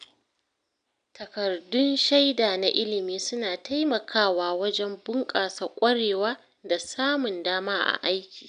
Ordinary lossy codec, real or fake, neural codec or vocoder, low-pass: none; real; none; 9.9 kHz